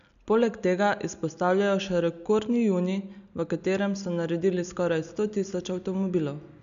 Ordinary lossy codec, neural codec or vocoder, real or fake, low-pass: none; none; real; 7.2 kHz